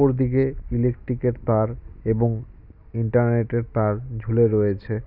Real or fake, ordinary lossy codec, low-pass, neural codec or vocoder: real; none; 5.4 kHz; none